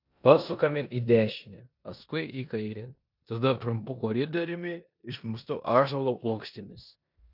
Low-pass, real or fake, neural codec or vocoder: 5.4 kHz; fake; codec, 16 kHz in and 24 kHz out, 0.9 kbps, LongCat-Audio-Codec, four codebook decoder